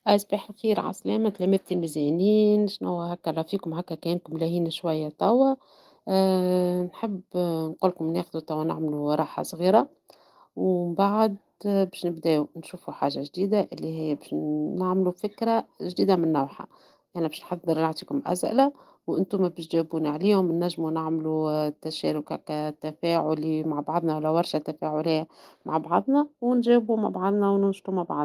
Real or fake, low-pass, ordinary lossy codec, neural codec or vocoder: real; 14.4 kHz; Opus, 24 kbps; none